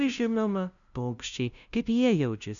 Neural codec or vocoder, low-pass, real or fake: codec, 16 kHz, 0.5 kbps, FunCodec, trained on LibriTTS, 25 frames a second; 7.2 kHz; fake